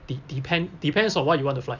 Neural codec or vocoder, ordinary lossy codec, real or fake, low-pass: none; none; real; 7.2 kHz